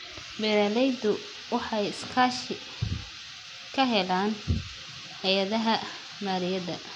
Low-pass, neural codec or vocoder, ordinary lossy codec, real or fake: 19.8 kHz; none; none; real